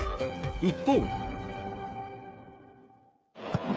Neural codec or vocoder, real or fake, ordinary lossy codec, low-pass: codec, 16 kHz, 8 kbps, FreqCodec, smaller model; fake; none; none